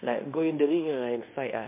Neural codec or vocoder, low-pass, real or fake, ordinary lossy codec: codec, 16 kHz in and 24 kHz out, 0.9 kbps, LongCat-Audio-Codec, fine tuned four codebook decoder; 3.6 kHz; fake; MP3, 32 kbps